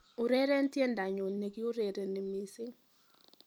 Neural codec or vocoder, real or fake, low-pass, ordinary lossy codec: vocoder, 44.1 kHz, 128 mel bands every 256 samples, BigVGAN v2; fake; none; none